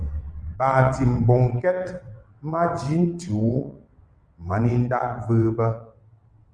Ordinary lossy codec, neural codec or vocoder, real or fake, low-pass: Opus, 64 kbps; vocoder, 22.05 kHz, 80 mel bands, WaveNeXt; fake; 9.9 kHz